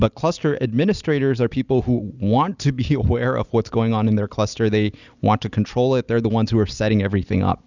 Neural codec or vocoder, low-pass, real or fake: none; 7.2 kHz; real